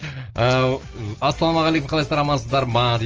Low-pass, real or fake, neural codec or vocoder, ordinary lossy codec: 7.2 kHz; real; none; Opus, 24 kbps